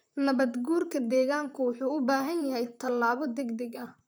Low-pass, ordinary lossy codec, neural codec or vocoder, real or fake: none; none; vocoder, 44.1 kHz, 128 mel bands, Pupu-Vocoder; fake